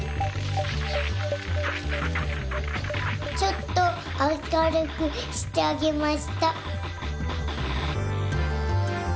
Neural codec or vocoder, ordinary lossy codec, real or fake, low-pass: none; none; real; none